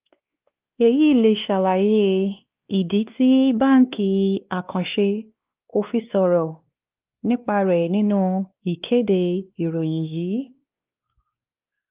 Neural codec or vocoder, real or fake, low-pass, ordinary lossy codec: codec, 16 kHz, 2 kbps, X-Codec, WavLM features, trained on Multilingual LibriSpeech; fake; 3.6 kHz; Opus, 32 kbps